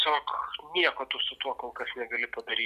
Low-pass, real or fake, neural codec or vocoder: 14.4 kHz; real; none